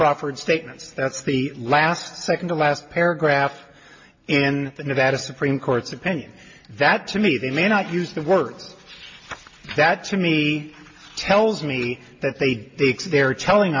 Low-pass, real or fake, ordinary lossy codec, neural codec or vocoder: 7.2 kHz; real; MP3, 32 kbps; none